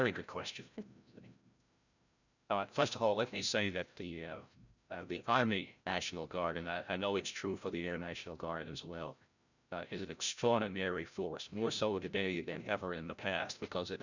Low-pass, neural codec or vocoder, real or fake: 7.2 kHz; codec, 16 kHz, 0.5 kbps, FreqCodec, larger model; fake